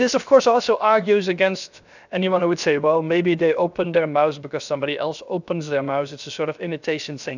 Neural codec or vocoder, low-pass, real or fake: codec, 16 kHz, 0.7 kbps, FocalCodec; 7.2 kHz; fake